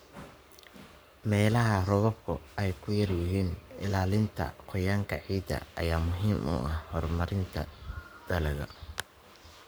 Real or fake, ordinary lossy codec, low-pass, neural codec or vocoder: fake; none; none; codec, 44.1 kHz, 7.8 kbps, Pupu-Codec